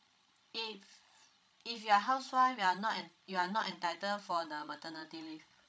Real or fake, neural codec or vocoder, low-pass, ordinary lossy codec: fake; codec, 16 kHz, 16 kbps, FreqCodec, larger model; none; none